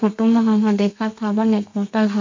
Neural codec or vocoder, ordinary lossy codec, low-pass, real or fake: codec, 32 kHz, 1.9 kbps, SNAC; MP3, 48 kbps; 7.2 kHz; fake